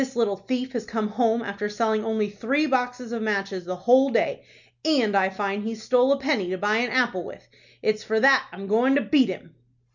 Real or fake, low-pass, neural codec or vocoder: real; 7.2 kHz; none